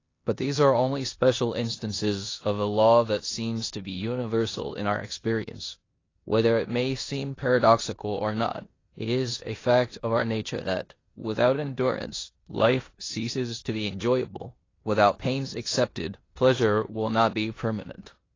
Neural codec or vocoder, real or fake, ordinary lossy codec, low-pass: codec, 16 kHz in and 24 kHz out, 0.9 kbps, LongCat-Audio-Codec, four codebook decoder; fake; AAC, 32 kbps; 7.2 kHz